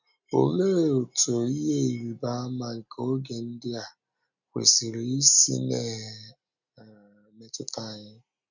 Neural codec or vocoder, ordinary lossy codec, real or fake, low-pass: none; none; real; 7.2 kHz